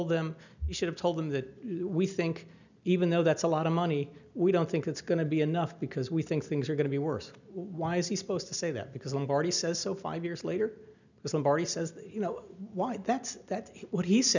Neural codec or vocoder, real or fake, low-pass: none; real; 7.2 kHz